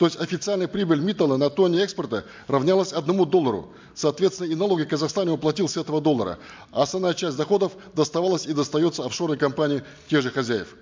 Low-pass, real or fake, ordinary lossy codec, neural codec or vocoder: 7.2 kHz; real; MP3, 64 kbps; none